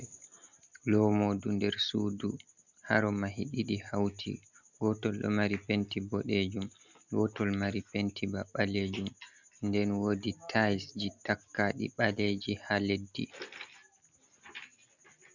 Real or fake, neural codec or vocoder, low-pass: real; none; 7.2 kHz